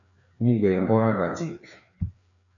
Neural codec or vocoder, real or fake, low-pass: codec, 16 kHz, 2 kbps, FreqCodec, larger model; fake; 7.2 kHz